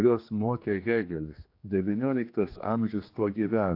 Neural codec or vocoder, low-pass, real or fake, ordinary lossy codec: codec, 16 kHz, 2 kbps, X-Codec, HuBERT features, trained on general audio; 5.4 kHz; fake; AAC, 32 kbps